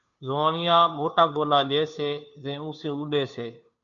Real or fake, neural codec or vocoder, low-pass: fake; codec, 16 kHz, 2 kbps, FunCodec, trained on Chinese and English, 25 frames a second; 7.2 kHz